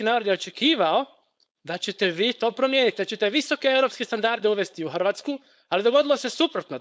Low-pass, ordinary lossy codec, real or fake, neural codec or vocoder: none; none; fake; codec, 16 kHz, 4.8 kbps, FACodec